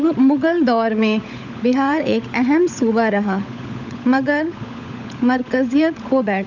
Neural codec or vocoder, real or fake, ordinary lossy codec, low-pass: codec, 16 kHz, 16 kbps, FunCodec, trained on LibriTTS, 50 frames a second; fake; none; 7.2 kHz